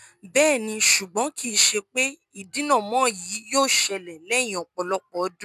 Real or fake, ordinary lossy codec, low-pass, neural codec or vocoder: real; none; 14.4 kHz; none